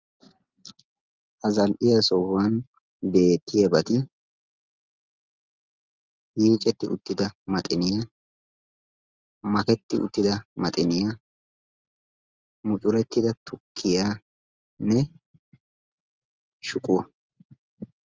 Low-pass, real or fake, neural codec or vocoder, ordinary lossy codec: 7.2 kHz; real; none; Opus, 24 kbps